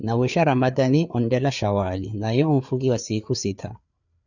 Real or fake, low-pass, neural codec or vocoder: fake; 7.2 kHz; codec, 16 kHz, 4 kbps, FreqCodec, larger model